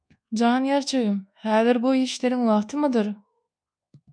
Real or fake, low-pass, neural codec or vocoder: fake; 9.9 kHz; codec, 24 kHz, 1.2 kbps, DualCodec